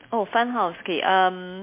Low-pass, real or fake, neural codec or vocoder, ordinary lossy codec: 3.6 kHz; real; none; MP3, 24 kbps